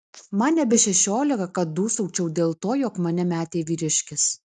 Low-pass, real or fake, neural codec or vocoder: 10.8 kHz; real; none